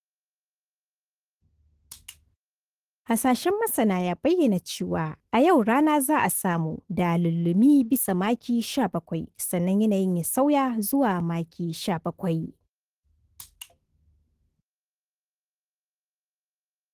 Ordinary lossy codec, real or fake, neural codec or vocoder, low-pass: Opus, 16 kbps; fake; autoencoder, 48 kHz, 128 numbers a frame, DAC-VAE, trained on Japanese speech; 14.4 kHz